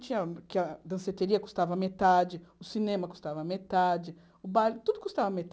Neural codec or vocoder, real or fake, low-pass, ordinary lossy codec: none; real; none; none